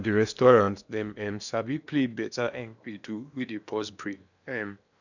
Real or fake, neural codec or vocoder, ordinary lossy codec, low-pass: fake; codec, 16 kHz in and 24 kHz out, 0.8 kbps, FocalCodec, streaming, 65536 codes; none; 7.2 kHz